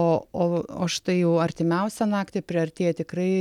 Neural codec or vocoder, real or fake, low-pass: none; real; 19.8 kHz